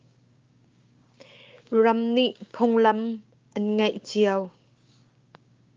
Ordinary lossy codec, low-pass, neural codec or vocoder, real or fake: Opus, 24 kbps; 7.2 kHz; codec, 16 kHz, 6 kbps, DAC; fake